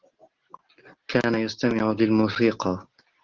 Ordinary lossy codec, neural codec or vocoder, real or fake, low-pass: Opus, 16 kbps; none; real; 7.2 kHz